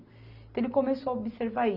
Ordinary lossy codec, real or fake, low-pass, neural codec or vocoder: none; real; 5.4 kHz; none